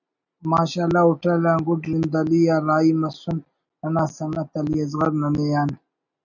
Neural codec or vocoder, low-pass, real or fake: none; 7.2 kHz; real